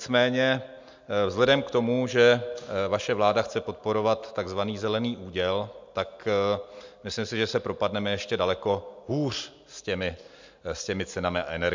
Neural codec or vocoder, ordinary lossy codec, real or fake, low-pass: none; MP3, 64 kbps; real; 7.2 kHz